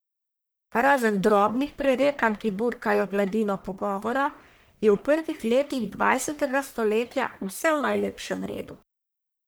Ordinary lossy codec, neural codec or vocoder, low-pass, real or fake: none; codec, 44.1 kHz, 1.7 kbps, Pupu-Codec; none; fake